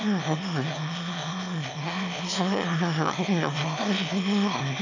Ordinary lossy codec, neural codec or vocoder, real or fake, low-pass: none; autoencoder, 22.05 kHz, a latent of 192 numbers a frame, VITS, trained on one speaker; fake; 7.2 kHz